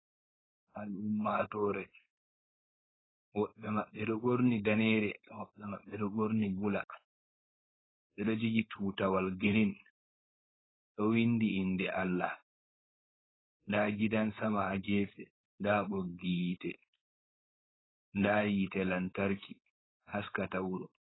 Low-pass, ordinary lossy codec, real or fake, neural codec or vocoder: 7.2 kHz; AAC, 16 kbps; fake; codec, 16 kHz, 4.8 kbps, FACodec